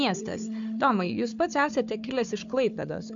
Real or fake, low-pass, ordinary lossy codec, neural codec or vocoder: fake; 7.2 kHz; MP3, 64 kbps; codec, 16 kHz, 4 kbps, FunCodec, trained on Chinese and English, 50 frames a second